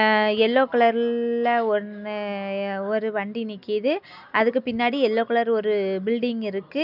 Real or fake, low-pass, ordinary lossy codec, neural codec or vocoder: real; 5.4 kHz; none; none